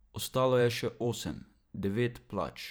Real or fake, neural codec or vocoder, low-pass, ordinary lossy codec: fake; vocoder, 44.1 kHz, 128 mel bands every 512 samples, BigVGAN v2; none; none